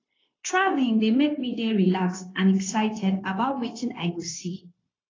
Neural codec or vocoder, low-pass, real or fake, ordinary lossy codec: codec, 16 kHz, 0.9 kbps, LongCat-Audio-Codec; 7.2 kHz; fake; AAC, 32 kbps